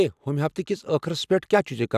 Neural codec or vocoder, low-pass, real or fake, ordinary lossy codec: none; 14.4 kHz; real; none